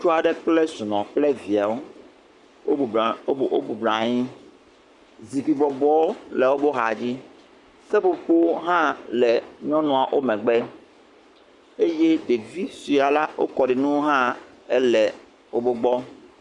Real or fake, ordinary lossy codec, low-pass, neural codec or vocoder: fake; Opus, 64 kbps; 10.8 kHz; codec, 44.1 kHz, 7.8 kbps, Pupu-Codec